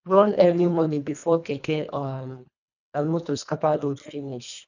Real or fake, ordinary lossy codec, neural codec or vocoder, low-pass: fake; none; codec, 24 kHz, 1.5 kbps, HILCodec; 7.2 kHz